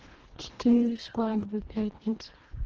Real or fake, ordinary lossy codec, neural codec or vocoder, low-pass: fake; Opus, 16 kbps; codec, 24 kHz, 1.5 kbps, HILCodec; 7.2 kHz